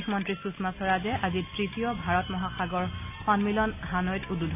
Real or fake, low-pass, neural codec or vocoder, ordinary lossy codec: real; 3.6 kHz; none; none